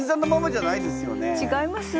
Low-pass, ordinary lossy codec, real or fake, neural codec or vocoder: none; none; real; none